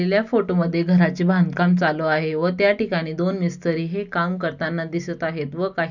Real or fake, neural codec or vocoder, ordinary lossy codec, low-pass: real; none; none; 7.2 kHz